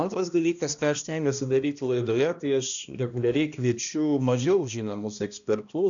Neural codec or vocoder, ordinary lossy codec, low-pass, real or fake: codec, 16 kHz, 1 kbps, X-Codec, HuBERT features, trained on balanced general audio; MP3, 96 kbps; 7.2 kHz; fake